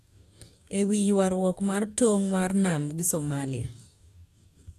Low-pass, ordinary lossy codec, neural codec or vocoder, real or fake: 14.4 kHz; none; codec, 44.1 kHz, 2.6 kbps, DAC; fake